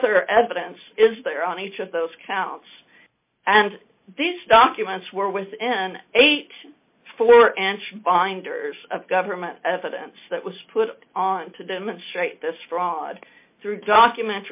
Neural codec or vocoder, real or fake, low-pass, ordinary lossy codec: none; real; 3.6 kHz; MP3, 24 kbps